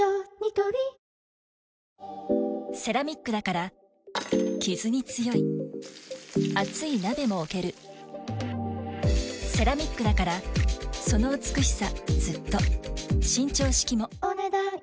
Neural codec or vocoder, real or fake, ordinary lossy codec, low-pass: none; real; none; none